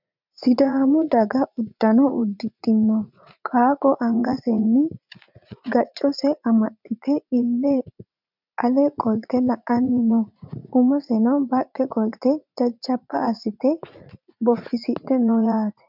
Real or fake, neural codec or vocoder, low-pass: fake; vocoder, 44.1 kHz, 80 mel bands, Vocos; 5.4 kHz